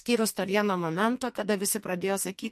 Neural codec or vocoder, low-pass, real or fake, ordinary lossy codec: codec, 44.1 kHz, 2.6 kbps, SNAC; 14.4 kHz; fake; MP3, 64 kbps